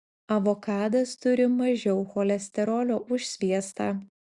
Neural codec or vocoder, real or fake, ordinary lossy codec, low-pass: none; real; Opus, 64 kbps; 10.8 kHz